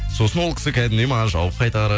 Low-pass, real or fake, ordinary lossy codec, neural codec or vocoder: none; real; none; none